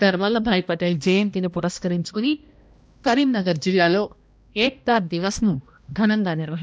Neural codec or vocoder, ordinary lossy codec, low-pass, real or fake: codec, 16 kHz, 1 kbps, X-Codec, HuBERT features, trained on balanced general audio; none; none; fake